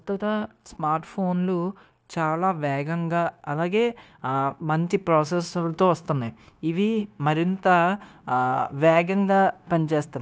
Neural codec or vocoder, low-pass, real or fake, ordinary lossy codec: codec, 16 kHz, 0.9 kbps, LongCat-Audio-Codec; none; fake; none